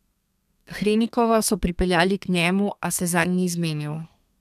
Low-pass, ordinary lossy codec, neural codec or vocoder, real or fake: 14.4 kHz; none; codec, 32 kHz, 1.9 kbps, SNAC; fake